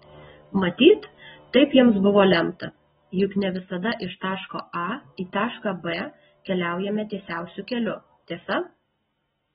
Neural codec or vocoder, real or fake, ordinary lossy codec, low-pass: none; real; AAC, 16 kbps; 7.2 kHz